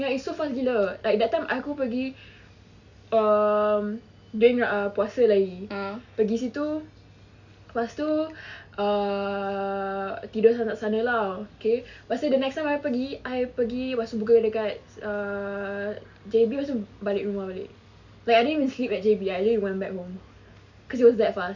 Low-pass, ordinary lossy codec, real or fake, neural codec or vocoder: 7.2 kHz; none; real; none